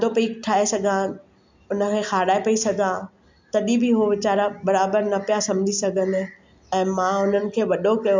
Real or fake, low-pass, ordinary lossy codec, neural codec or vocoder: real; 7.2 kHz; MP3, 64 kbps; none